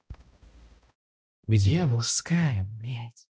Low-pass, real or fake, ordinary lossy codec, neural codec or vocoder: none; fake; none; codec, 16 kHz, 0.5 kbps, X-Codec, HuBERT features, trained on balanced general audio